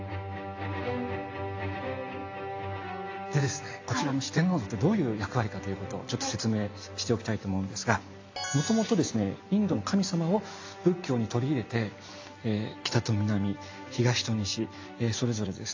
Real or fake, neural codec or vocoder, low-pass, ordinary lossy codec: real; none; 7.2 kHz; AAC, 48 kbps